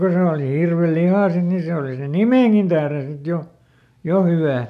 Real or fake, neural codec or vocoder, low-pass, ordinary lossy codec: real; none; 14.4 kHz; none